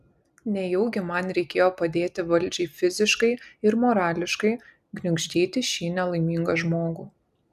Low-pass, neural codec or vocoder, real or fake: 14.4 kHz; none; real